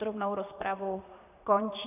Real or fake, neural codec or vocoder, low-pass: fake; vocoder, 44.1 kHz, 128 mel bands, Pupu-Vocoder; 3.6 kHz